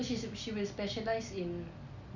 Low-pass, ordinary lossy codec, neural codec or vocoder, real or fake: 7.2 kHz; none; none; real